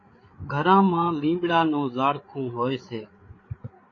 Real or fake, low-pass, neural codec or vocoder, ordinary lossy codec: fake; 7.2 kHz; codec, 16 kHz, 8 kbps, FreqCodec, larger model; AAC, 32 kbps